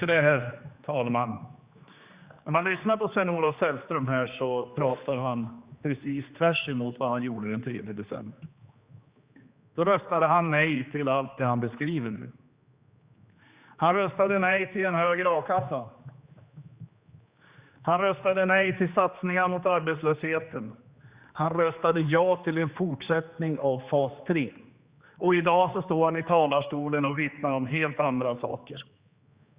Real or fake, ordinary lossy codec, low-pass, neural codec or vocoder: fake; Opus, 64 kbps; 3.6 kHz; codec, 16 kHz, 2 kbps, X-Codec, HuBERT features, trained on general audio